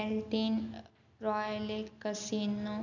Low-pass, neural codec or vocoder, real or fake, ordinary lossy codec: 7.2 kHz; none; real; none